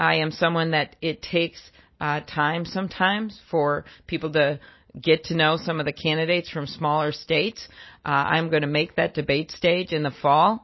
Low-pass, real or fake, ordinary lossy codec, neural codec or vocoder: 7.2 kHz; real; MP3, 24 kbps; none